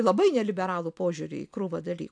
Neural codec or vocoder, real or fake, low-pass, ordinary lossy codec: none; real; 9.9 kHz; AAC, 64 kbps